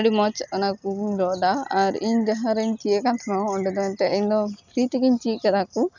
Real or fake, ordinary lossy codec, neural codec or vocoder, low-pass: real; none; none; 7.2 kHz